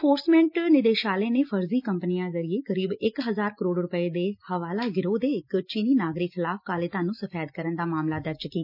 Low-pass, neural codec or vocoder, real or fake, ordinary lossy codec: 5.4 kHz; none; real; none